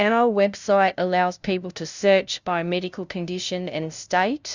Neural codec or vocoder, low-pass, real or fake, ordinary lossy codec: codec, 16 kHz, 0.5 kbps, FunCodec, trained on LibriTTS, 25 frames a second; 7.2 kHz; fake; Opus, 64 kbps